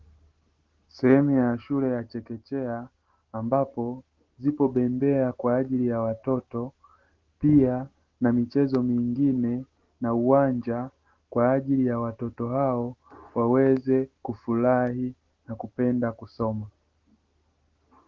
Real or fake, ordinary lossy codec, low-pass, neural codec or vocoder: real; Opus, 16 kbps; 7.2 kHz; none